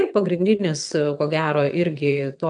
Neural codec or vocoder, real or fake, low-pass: vocoder, 22.05 kHz, 80 mel bands, WaveNeXt; fake; 9.9 kHz